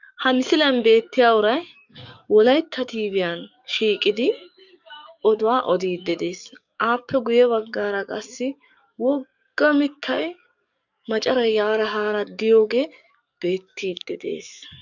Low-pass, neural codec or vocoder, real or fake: 7.2 kHz; codec, 44.1 kHz, 7.8 kbps, DAC; fake